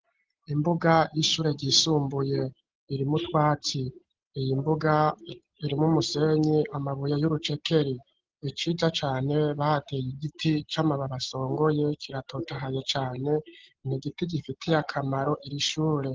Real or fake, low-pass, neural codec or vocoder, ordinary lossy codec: real; 7.2 kHz; none; Opus, 16 kbps